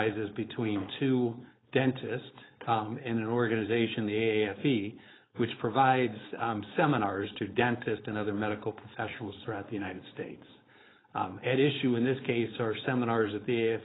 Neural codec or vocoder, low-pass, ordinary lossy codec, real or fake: codec, 16 kHz, 4.8 kbps, FACodec; 7.2 kHz; AAC, 16 kbps; fake